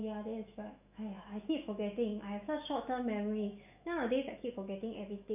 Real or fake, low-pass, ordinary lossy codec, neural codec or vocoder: fake; 3.6 kHz; none; autoencoder, 48 kHz, 128 numbers a frame, DAC-VAE, trained on Japanese speech